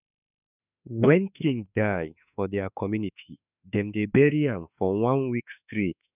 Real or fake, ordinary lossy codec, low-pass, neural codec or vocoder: fake; none; 3.6 kHz; autoencoder, 48 kHz, 32 numbers a frame, DAC-VAE, trained on Japanese speech